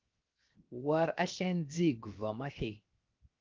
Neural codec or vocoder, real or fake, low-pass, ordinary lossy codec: codec, 16 kHz, 0.7 kbps, FocalCodec; fake; 7.2 kHz; Opus, 32 kbps